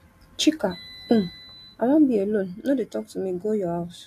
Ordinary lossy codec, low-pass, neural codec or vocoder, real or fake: AAC, 64 kbps; 14.4 kHz; none; real